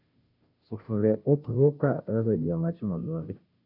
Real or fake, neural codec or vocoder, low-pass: fake; codec, 16 kHz, 0.5 kbps, FunCodec, trained on Chinese and English, 25 frames a second; 5.4 kHz